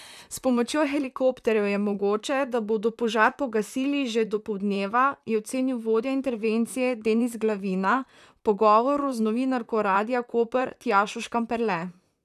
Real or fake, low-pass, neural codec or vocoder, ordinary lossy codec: fake; 14.4 kHz; vocoder, 44.1 kHz, 128 mel bands, Pupu-Vocoder; none